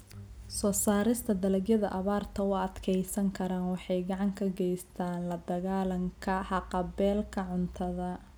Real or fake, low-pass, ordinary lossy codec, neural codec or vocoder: real; none; none; none